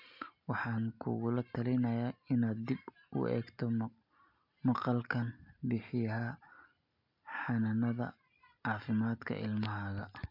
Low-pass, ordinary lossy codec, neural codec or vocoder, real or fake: 5.4 kHz; MP3, 48 kbps; none; real